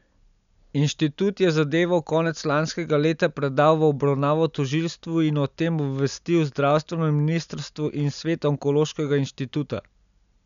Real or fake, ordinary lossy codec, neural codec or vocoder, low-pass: real; none; none; 7.2 kHz